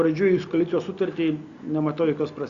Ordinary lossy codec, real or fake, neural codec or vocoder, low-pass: Opus, 64 kbps; real; none; 7.2 kHz